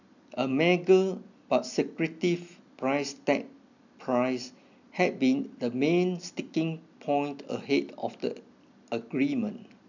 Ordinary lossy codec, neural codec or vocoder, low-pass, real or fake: none; none; 7.2 kHz; real